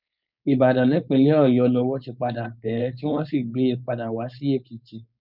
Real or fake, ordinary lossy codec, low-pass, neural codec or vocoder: fake; none; 5.4 kHz; codec, 16 kHz, 4.8 kbps, FACodec